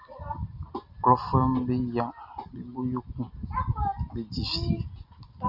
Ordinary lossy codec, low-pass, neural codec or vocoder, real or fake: Opus, 64 kbps; 5.4 kHz; none; real